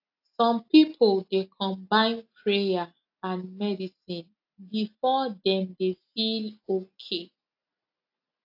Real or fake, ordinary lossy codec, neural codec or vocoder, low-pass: real; AAC, 48 kbps; none; 5.4 kHz